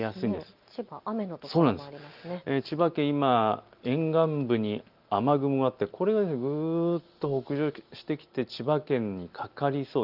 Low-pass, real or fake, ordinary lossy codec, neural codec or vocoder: 5.4 kHz; real; Opus, 24 kbps; none